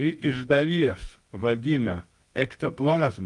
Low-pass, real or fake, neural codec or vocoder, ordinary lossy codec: 10.8 kHz; fake; codec, 24 kHz, 0.9 kbps, WavTokenizer, medium music audio release; Opus, 32 kbps